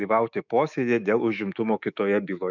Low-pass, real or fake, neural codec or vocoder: 7.2 kHz; fake; autoencoder, 48 kHz, 128 numbers a frame, DAC-VAE, trained on Japanese speech